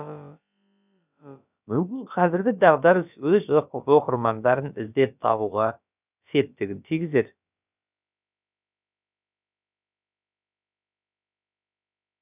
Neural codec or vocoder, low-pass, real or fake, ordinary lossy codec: codec, 16 kHz, about 1 kbps, DyCAST, with the encoder's durations; 3.6 kHz; fake; none